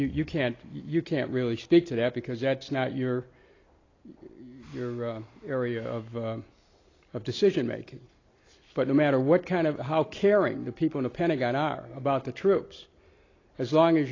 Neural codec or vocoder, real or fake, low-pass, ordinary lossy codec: none; real; 7.2 kHz; AAC, 32 kbps